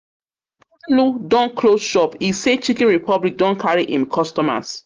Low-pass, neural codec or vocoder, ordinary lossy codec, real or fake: 7.2 kHz; none; Opus, 24 kbps; real